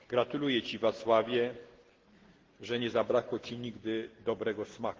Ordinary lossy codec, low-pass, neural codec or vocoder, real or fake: Opus, 16 kbps; 7.2 kHz; none; real